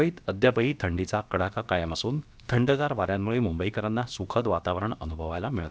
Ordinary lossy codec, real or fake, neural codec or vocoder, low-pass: none; fake; codec, 16 kHz, about 1 kbps, DyCAST, with the encoder's durations; none